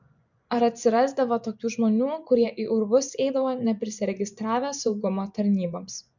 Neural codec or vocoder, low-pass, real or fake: vocoder, 24 kHz, 100 mel bands, Vocos; 7.2 kHz; fake